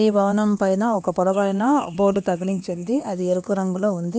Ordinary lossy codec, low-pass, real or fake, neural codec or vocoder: none; none; fake; codec, 16 kHz, 2 kbps, X-Codec, HuBERT features, trained on LibriSpeech